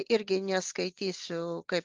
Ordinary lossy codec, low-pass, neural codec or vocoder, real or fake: Opus, 32 kbps; 7.2 kHz; none; real